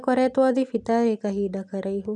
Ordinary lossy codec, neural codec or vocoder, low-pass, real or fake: none; none; none; real